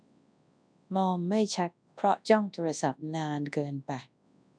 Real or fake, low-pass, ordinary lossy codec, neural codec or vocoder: fake; 9.9 kHz; none; codec, 24 kHz, 0.9 kbps, WavTokenizer, large speech release